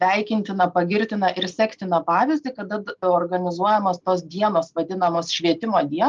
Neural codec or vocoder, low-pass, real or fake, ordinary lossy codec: none; 7.2 kHz; real; Opus, 24 kbps